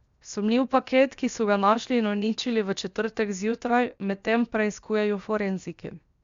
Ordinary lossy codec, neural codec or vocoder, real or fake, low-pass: Opus, 64 kbps; codec, 16 kHz, 0.7 kbps, FocalCodec; fake; 7.2 kHz